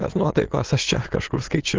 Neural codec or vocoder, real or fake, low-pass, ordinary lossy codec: autoencoder, 22.05 kHz, a latent of 192 numbers a frame, VITS, trained on many speakers; fake; 7.2 kHz; Opus, 16 kbps